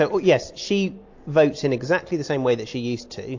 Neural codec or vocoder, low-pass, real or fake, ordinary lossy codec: none; 7.2 kHz; real; AAC, 48 kbps